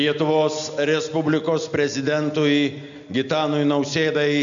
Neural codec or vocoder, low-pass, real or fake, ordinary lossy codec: none; 7.2 kHz; real; MP3, 64 kbps